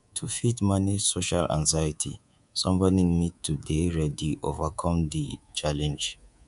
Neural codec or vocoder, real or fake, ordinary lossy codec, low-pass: codec, 24 kHz, 3.1 kbps, DualCodec; fake; none; 10.8 kHz